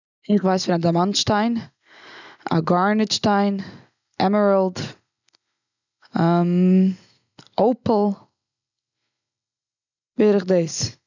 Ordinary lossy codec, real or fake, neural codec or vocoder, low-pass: none; real; none; 7.2 kHz